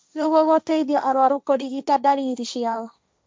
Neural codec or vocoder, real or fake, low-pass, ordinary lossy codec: codec, 16 kHz, 1.1 kbps, Voila-Tokenizer; fake; none; none